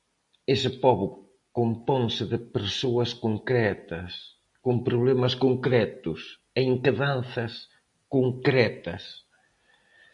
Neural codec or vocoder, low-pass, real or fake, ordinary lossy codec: none; 10.8 kHz; real; AAC, 64 kbps